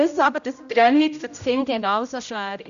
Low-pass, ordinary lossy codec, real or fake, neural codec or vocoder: 7.2 kHz; none; fake; codec, 16 kHz, 0.5 kbps, X-Codec, HuBERT features, trained on general audio